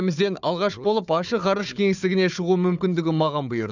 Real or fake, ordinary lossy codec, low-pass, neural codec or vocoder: fake; none; 7.2 kHz; codec, 16 kHz, 4 kbps, FunCodec, trained on Chinese and English, 50 frames a second